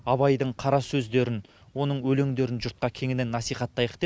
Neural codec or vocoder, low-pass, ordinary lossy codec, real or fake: none; none; none; real